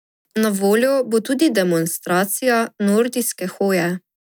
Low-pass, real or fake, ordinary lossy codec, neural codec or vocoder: none; real; none; none